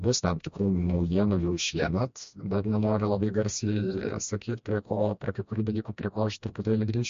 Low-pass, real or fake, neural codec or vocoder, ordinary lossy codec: 7.2 kHz; fake; codec, 16 kHz, 1 kbps, FreqCodec, smaller model; MP3, 48 kbps